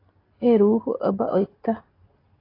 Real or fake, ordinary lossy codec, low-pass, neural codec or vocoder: real; AAC, 24 kbps; 5.4 kHz; none